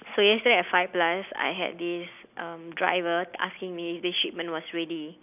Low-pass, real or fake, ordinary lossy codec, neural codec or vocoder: 3.6 kHz; real; none; none